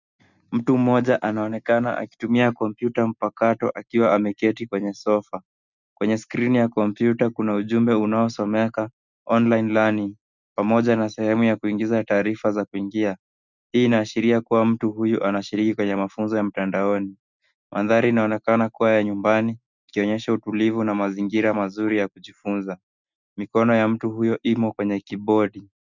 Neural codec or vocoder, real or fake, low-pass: none; real; 7.2 kHz